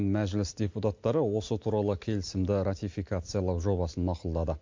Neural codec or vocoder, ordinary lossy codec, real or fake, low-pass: none; MP3, 48 kbps; real; 7.2 kHz